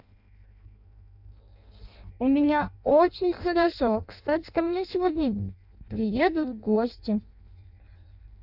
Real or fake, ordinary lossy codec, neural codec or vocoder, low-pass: fake; none; codec, 16 kHz in and 24 kHz out, 0.6 kbps, FireRedTTS-2 codec; 5.4 kHz